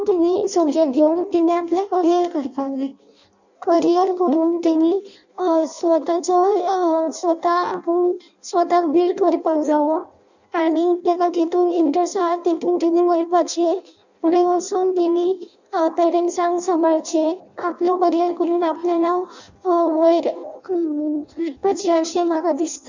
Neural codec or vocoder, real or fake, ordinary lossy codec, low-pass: codec, 16 kHz in and 24 kHz out, 0.6 kbps, FireRedTTS-2 codec; fake; none; 7.2 kHz